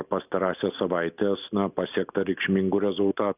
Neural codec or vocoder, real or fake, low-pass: none; real; 3.6 kHz